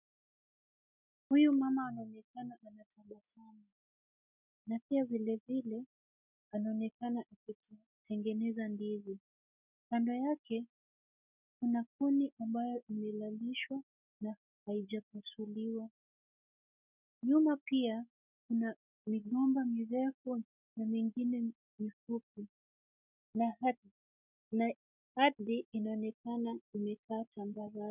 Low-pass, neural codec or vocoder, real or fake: 3.6 kHz; none; real